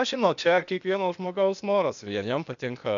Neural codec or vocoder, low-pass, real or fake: codec, 16 kHz, 0.8 kbps, ZipCodec; 7.2 kHz; fake